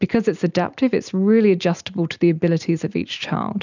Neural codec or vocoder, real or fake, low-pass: none; real; 7.2 kHz